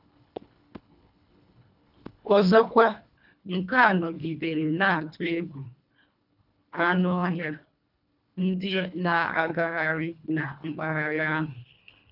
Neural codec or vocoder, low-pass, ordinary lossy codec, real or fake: codec, 24 kHz, 1.5 kbps, HILCodec; 5.4 kHz; none; fake